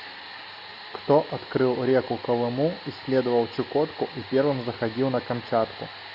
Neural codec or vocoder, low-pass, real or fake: none; 5.4 kHz; real